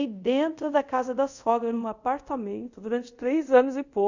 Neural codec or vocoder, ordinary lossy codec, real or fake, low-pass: codec, 24 kHz, 0.5 kbps, DualCodec; none; fake; 7.2 kHz